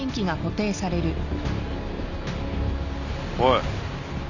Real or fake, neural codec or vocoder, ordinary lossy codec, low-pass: real; none; none; 7.2 kHz